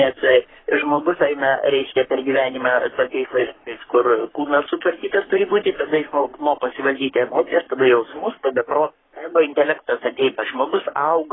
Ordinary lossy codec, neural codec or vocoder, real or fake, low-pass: AAC, 16 kbps; codec, 44.1 kHz, 3.4 kbps, Pupu-Codec; fake; 7.2 kHz